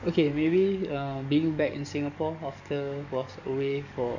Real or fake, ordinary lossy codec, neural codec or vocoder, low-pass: fake; none; codec, 44.1 kHz, 7.8 kbps, DAC; 7.2 kHz